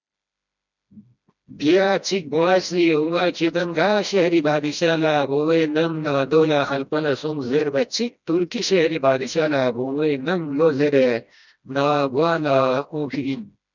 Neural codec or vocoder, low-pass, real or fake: codec, 16 kHz, 1 kbps, FreqCodec, smaller model; 7.2 kHz; fake